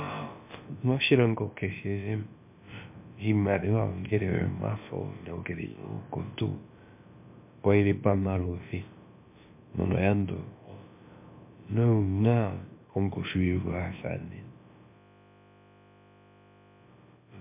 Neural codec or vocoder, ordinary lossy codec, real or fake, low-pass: codec, 16 kHz, about 1 kbps, DyCAST, with the encoder's durations; MP3, 32 kbps; fake; 3.6 kHz